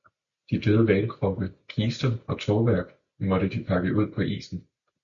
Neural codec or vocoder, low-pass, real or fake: none; 7.2 kHz; real